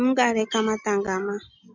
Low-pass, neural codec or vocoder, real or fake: 7.2 kHz; none; real